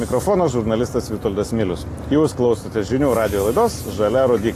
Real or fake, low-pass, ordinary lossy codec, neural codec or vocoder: real; 14.4 kHz; AAC, 48 kbps; none